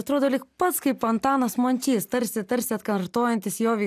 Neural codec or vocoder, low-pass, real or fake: none; 14.4 kHz; real